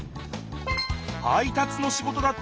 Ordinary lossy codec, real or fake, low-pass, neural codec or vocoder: none; real; none; none